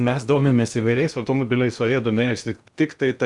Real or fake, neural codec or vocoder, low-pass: fake; codec, 16 kHz in and 24 kHz out, 0.8 kbps, FocalCodec, streaming, 65536 codes; 10.8 kHz